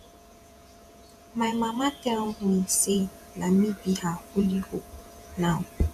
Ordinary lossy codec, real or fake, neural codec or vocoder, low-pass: none; fake; vocoder, 48 kHz, 128 mel bands, Vocos; 14.4 kHz